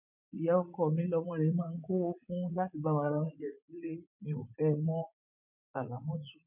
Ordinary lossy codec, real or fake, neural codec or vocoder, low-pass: none; fake; vocoder, 44.1 kHz, 80 mel bands, Vocos; 3.6 kHz